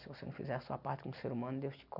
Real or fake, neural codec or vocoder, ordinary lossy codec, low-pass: real; none; none; 5.4 kHz